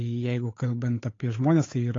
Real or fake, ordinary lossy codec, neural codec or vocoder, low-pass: fake; AAC, 32 kbps; codec, 16 kHz, 8 kbps, FunCodec, trained on Chinese and English, 25 frames a second; 7.2 kHz